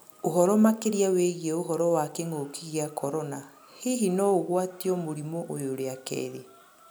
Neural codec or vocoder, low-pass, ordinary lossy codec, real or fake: none; none; none; real